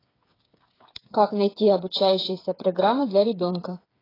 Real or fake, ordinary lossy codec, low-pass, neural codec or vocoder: fake; AAC, 24 kbps; 5.4 kHz; codec, 16 kHz, 8 kbps, FreqCodec, smaller model